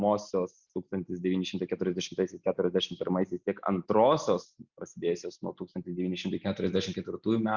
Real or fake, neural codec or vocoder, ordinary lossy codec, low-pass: real; none; Opus, 64 kbps; 7.2 kHz